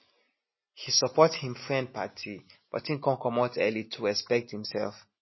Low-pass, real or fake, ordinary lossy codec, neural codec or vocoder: 7.2 kHz; real; MP3, 24 kbps; none